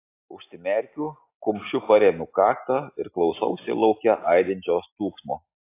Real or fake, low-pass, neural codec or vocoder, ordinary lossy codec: real; 3.6 kHz; none; AAC, 24 kbps